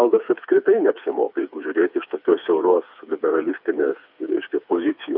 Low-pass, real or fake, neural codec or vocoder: 5.4 kHz; fake; codec, 16 kHz, 4 kbps, FreqCodec, smaller model